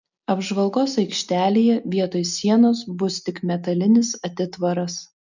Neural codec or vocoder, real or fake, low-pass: none; real; 7.2 kHz